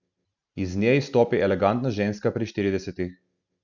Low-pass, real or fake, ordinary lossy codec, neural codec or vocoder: 7.2 kHz; real; none; none